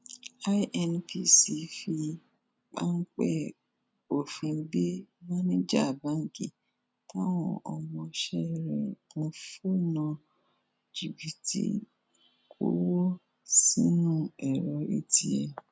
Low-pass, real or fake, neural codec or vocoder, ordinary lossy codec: none; real; none; none